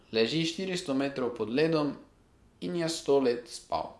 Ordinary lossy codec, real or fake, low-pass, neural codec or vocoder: none; fake; none; vocoder, 24 kHz, 100 mel bands, Vocos